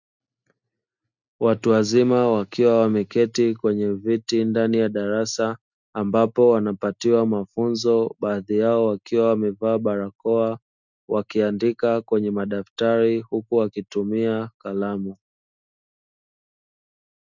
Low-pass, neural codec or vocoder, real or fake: 7.2 kHz; none; real